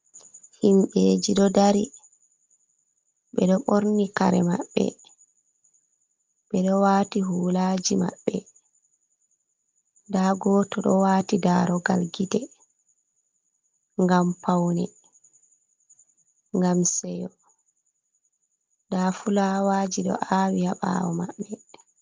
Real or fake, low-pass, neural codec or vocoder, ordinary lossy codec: real; 7.2 kHz; none; Opus, 32 kbps